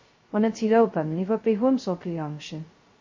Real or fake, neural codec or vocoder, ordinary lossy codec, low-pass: fake; codec, 16 kHz, 0.2 kbps, FocalCodec; MP3, 32 kbps; 7.2 kHz